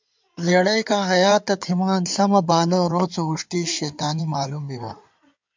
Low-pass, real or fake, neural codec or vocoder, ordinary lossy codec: 7.2 kHz; fake; codec, 16 kHz in and 24 kHz out, 2.2 kbps, FireRedTTS-2 codec; MP3, 64 kbps